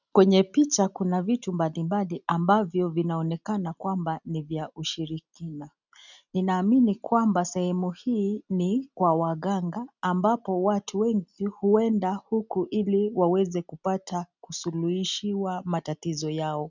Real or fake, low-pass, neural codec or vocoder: real; 7.2 kHz; none